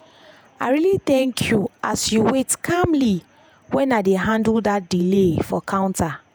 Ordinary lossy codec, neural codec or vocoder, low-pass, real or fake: none; vocoder, 48 kHz, 128 mel bands, Vocos; none; fake